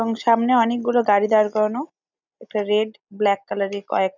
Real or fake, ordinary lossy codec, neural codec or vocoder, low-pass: real; none; none; 7.2 kHz